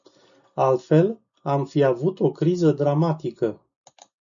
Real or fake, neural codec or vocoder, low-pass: real; none; 7.2 kHz